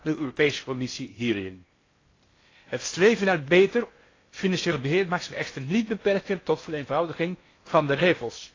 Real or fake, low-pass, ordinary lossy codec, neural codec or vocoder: fake; 7.2 kHz; AAC, 32 kbps; codec, 16 kHz in and 24 kHz out, 0.6 kbps, FocalCodec, streaming, 4096 codes